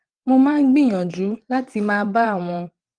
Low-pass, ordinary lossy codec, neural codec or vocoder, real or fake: 9.9 kHz; Opus, 24 kbps; vocoder, 22.05 kHz, 80 mel bands, WaveNeXt; fake